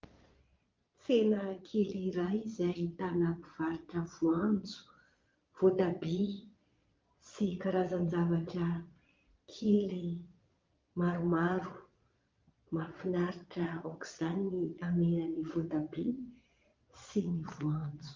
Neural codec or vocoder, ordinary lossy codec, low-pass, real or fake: vocoder, 22.05 kHz, 80 mel bands, Vocos; Opus, 24 kbps; 7.2 kHz; fake